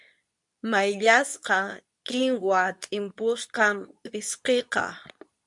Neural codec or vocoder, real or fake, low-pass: codec, 24 kHz, 0.9 kbps, WavTokenizer, medium speech release version 2; fake; 10.8 kHz